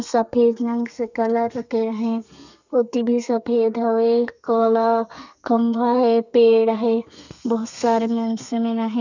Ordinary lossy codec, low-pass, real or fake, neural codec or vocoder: none; 7.2 kHz; fake; codec, 32 kHz, 1.9 kbps, SNAC